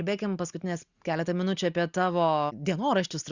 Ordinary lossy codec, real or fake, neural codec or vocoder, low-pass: Opus, 64 kbps; real; none; 7.2 kHz